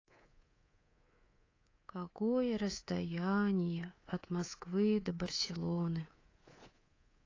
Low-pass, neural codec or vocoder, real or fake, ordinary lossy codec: 7.2 kHz; codec, 24 kHz, 3.1 kbps, DualCodec; fake; AAC, 32 kbps